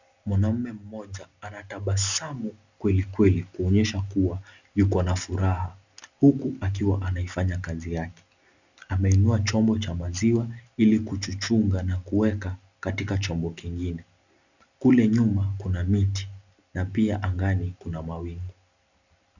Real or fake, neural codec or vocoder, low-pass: real; none; 7.2 kHz